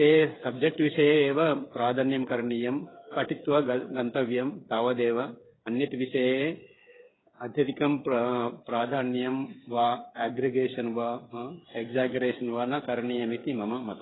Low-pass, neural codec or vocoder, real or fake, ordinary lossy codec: 7.2 kHz; codec, 16 kHz, 8 kbps, FreqCodec, smaller model; fake; AAC, 16 kbps